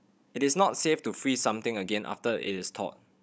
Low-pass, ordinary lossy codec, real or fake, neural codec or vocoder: none; none; fake; codec, 16 kHz, 16 kbps, FunCodec, trained on Chinese and English, 50 frames a second